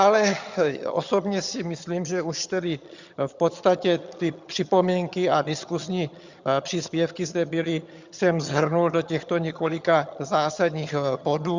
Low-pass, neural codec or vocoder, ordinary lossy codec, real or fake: 7.2 kHz; vocoder, 22.05 kHz, 80 mel bands, HiFi-GAN; Opus, 64 kbps; fake